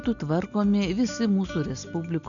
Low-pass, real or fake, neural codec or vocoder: 7.2 kHz; real; none